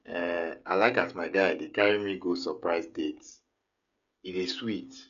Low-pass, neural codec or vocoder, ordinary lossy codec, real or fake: 7.2 kHz; codec, 16 kHz, 16 kbps, FreqCodec, smaller model; none; fake